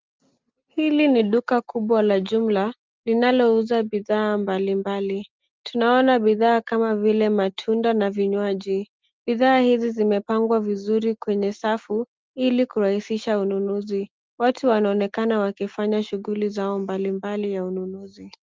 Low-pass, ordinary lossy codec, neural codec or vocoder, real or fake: 7.2 kHz; Opus, 24 kbps; none; real